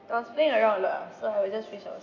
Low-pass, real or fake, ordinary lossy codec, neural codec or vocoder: 7.2 kHz; real; none; none